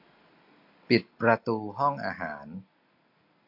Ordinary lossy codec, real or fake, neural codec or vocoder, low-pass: AAC, 48 kbps; real; none; 5.4 kHz